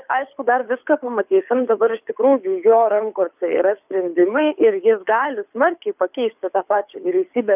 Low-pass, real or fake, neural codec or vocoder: 3.6 kHz; fake; codec, 24 kHz, 6 kbps, HILCodec